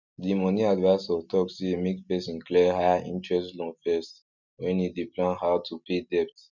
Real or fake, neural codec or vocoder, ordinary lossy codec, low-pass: real; none; none; 7.2 kHz